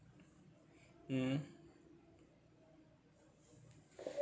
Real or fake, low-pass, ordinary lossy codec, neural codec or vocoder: real; none; none; none